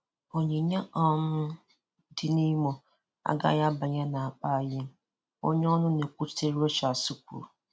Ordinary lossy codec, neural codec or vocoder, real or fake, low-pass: none; none; real; none